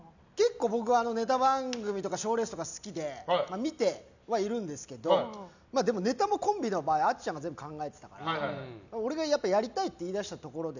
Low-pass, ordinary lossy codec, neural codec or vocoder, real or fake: 7.2 kHz; none; none; real